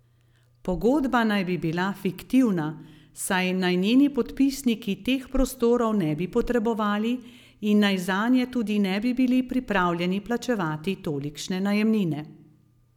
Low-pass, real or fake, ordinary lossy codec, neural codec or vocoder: 19.8 kHz; real; none; none